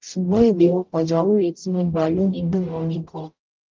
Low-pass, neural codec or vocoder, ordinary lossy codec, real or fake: 7.2 kHz; codec, 44.1 kHz, 0.9 kbps, DAC; Opus, 32 kbps; fake